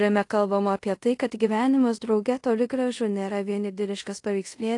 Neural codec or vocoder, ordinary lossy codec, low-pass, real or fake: codec, 24 kHz, 0.5 kbps, DualCodec; AAC, 48 kbps; 10.8 kHz; fake